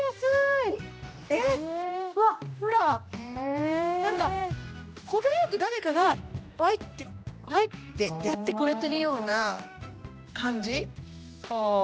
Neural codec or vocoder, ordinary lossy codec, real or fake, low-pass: codec, 16 kHz, 1 kbps, X-Codec, HuBERT features, trained on general audio; none; fake; none